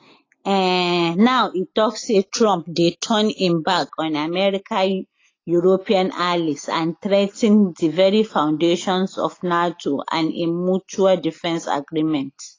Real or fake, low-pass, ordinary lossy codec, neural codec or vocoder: real; 7.2 kHz; AAC, 32 kbps; none